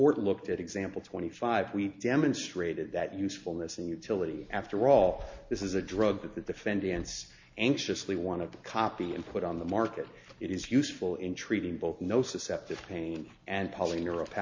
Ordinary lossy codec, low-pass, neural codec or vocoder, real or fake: MP3, 48 kbps; 7.2 kHz; none; real